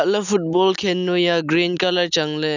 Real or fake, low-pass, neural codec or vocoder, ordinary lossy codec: real; 7.2 kHz; none; none